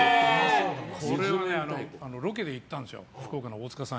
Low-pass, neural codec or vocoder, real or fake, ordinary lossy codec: none; none; real; none